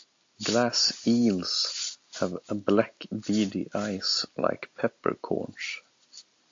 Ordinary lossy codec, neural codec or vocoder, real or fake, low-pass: AAC, 48 kbps; none; real; 7.2 kHz